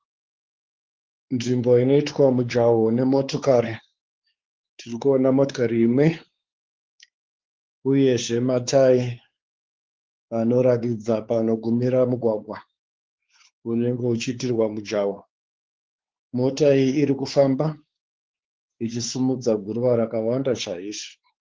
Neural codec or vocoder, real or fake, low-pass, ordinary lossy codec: codec, 16 kHz, 4 kbps, X-Codec, WavLM features, trained on Multilingual LibriSpeech; fake; 7.2 kHz; Opus, 16 kbps